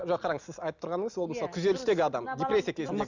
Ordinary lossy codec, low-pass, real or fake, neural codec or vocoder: none; 7.2 kHz; real; none